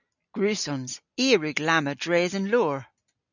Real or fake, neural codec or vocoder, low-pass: real; none; 7.2 kHz